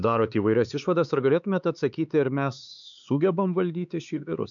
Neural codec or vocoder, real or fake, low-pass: codec, 16 kHz, 4 kbps, X-Codec, HuBERT features, trained on LibriSpeech; fake; 7.2 kHz